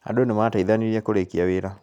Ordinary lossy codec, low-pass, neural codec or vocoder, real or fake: none; 19.8 kHz; none; real